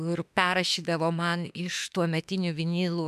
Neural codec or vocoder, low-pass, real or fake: autoencoder, 48 kHz, 32 numbers a frame, DAC-VAE, trained on Japanese speech; 14.4 kHz; fake